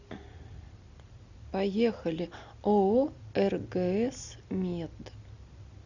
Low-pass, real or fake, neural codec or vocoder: 7.2 kHz; real; none